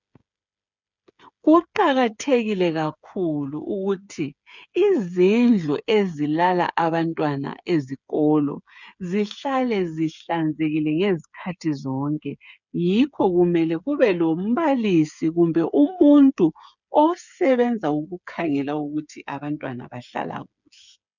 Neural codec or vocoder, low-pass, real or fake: codec, 16 kHz, 8 kbps, FreqCodec, smaller model; 7.2 kHz; fake